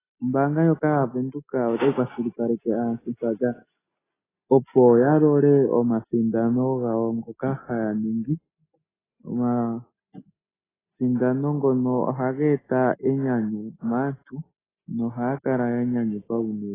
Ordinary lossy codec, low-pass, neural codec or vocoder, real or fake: AAC, 16 kbps; 3.6 kHz; none; real